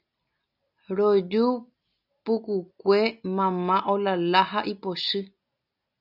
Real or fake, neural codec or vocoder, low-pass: real; none; 5.4 kHz